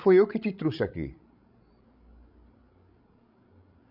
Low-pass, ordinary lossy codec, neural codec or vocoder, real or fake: 5.4 kHz; none; codec, 16 kHz, 16 kbps, FreqCodec, larger model; fake